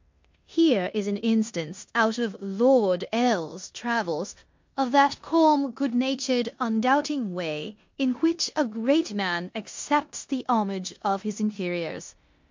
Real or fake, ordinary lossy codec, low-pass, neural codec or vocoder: fake; MP3, 48 kbps; 7.2 kHz; codec, 16 kHz in and 24 kHz out, 0.9 kbps, LongCat-Audio-Codec, four codebook decoder